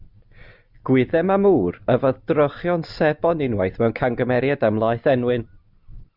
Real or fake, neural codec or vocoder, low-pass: real; none; 5.4 kHz